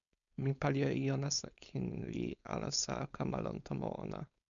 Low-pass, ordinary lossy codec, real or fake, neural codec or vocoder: 7.2 kHz; MP3, 96 kbps; fake; codec, 16 kHz, 4.8 kbps, FACodec